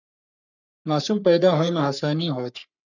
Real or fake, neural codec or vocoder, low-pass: fake; codec, 44.1 kHz, 3.4 kbps, Pupu-Codec; 7.2 kHz